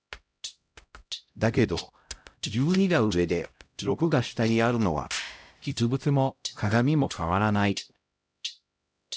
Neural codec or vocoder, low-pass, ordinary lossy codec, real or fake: codec, 16 kHz, 0.5 kbps, X-Codec, HuBERT features, trained on LibriSpeech; none; none; fake